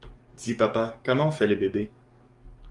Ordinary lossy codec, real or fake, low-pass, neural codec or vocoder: Opus, 32 kbps; fake; 10.8 kHz; codec, 44.1 kHz, 7.8 kbps, DAC